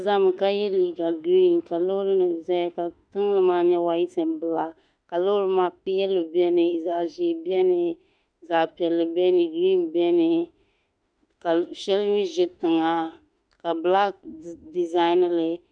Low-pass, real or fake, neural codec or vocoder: 9.9 kHz; fake; autoencoder, 48 kHz, 32 numbers a frame, DAC-VAE, trained on Japanese speech